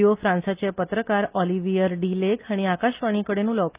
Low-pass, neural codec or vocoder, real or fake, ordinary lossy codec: 3.6 kHz; none; real; Opus, 24 kbps